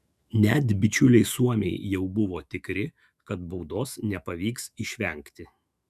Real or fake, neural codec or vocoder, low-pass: fake; autoencoder, 48 kHz, 128 numbers a frame, DAC-VAE, trained on Japanese speech; 14.4 kHz